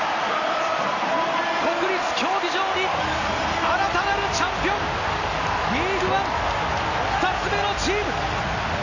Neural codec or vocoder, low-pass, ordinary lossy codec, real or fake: none; 7.2 kHz; none; real